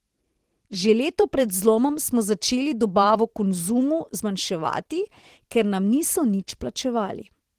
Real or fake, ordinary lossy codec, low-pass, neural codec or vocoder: fake; Opus, 16 kbps; 14.4 kHz; vocoder, 44.1 kHz, 128 mel bands every 512 samples, BigVGAN v2